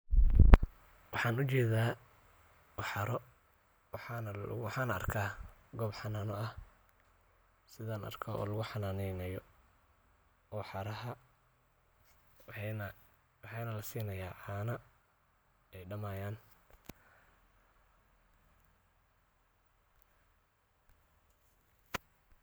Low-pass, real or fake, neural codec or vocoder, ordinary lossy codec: none; real; none; none